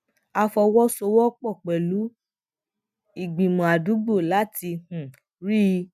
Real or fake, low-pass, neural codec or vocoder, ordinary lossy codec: real; 14.4 kHz; none; none